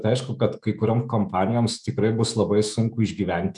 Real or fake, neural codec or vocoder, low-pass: fake; autoencoder, 48 kHz, 128 numbers a frame, DAC-VAE, trained on Japanese speech; 10.8 kHz